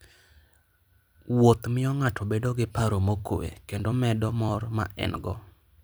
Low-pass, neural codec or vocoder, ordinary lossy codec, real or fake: none; vocoder, 44.1 kHz, 128 mel bands every 256 samples, BigVGAN v2; none; fake